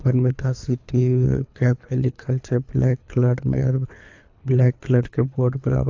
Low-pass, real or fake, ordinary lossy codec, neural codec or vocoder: 7.2 kHz; fake; AAC, 48 kbps; codec, 24 kHz, 3 kbps, HILCodec